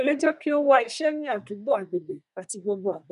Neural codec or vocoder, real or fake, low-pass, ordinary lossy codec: codec, 24 kHz, 1 kbps, SNAC; fake; 10.8 kHz; none